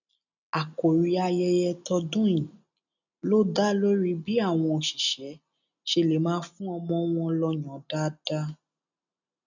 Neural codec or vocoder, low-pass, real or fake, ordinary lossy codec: none; 7.2 kHz; real; none